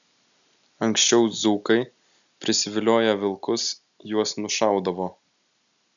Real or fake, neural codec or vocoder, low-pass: real; none; 7.2 kHz